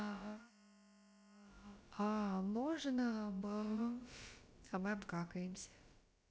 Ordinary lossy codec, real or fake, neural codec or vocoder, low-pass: none; fake; codec, 16 kHz, about 1 kbps, DyCAST, with the encoder's durations; none